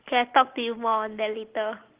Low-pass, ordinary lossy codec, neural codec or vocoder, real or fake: 3.6 kHz; Opus, 16 kbps; none; real